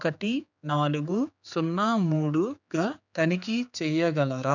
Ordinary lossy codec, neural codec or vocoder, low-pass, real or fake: none; codec, 16 kHz, 4 kbps, X-Codec, HuBERT features, trained on general audio; 7.2 kHz; fake